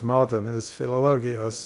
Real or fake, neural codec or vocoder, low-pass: fake; codec, 16 kHz in and 24 kHz out, 0.6 kbps, FocalCodec, streaming, 2048 codes; 10.8 kHz